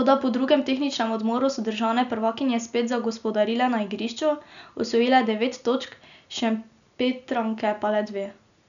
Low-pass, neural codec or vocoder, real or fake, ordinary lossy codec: 7.2 kHz; none; real; none